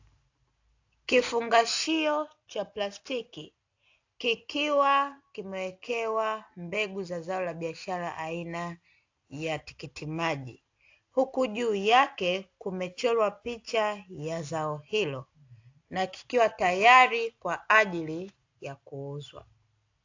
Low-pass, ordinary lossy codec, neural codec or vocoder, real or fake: 7.2 kHz; AAC, 48 kbps; none; real